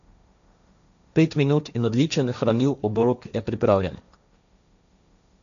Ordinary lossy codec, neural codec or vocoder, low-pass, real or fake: none; codec, 16 kHz, 1.1 kbps, Voila-Tokenizer; 7.2 kHz; fake